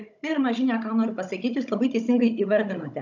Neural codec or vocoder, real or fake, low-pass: codec, 16 kHz, 16 kbps, FunCodec, trained on Chinese and English, 50 frames a second; fake; 7.2 kHz